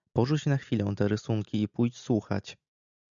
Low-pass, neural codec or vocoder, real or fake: 7.2 kHz; none; real